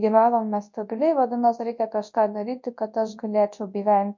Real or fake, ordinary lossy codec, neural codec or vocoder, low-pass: fake; MP3, 48 kbps; codec, 24 kHz, 0.9 kbps, WavTokenizer, large speech release; 7.2 kHz